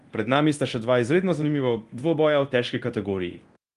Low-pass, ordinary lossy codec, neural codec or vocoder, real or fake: 10.8 kHz; Opus, 24 kbps; codec, 24 kHz, 0.9 kbps, DualCodec; fake